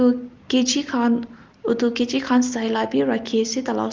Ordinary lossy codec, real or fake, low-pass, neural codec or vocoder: Opus, 24 kbps; real; 7.2 kHz; none